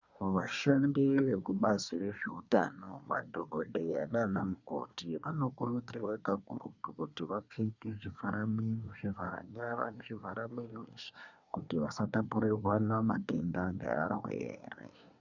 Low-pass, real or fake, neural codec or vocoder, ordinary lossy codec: 7.2 kHz; fake; codec, 24 kHz, 1 kbps, SNAC; Opus, 64 kbps